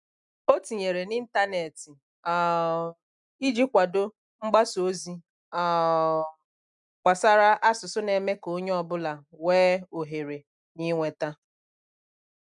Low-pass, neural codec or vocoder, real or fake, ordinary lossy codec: 10.8 kHz; none; real; none